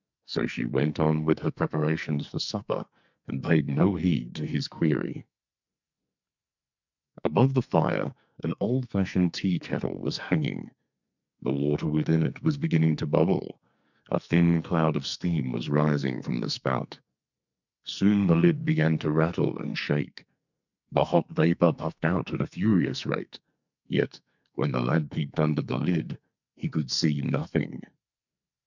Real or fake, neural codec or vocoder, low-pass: fake; codec, 44.1 kHz, 2.6 kbps, SNAC; 7.2 kHz